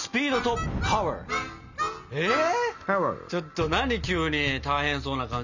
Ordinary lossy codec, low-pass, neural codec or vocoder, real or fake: none; 7.2 kHz; none; real